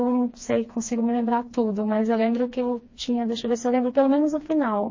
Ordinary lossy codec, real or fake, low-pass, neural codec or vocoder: MP3, 32 kbps; fake; 7.2 kHz; codec, 16 kHz, 2 kbps, FreqCodec, smaller model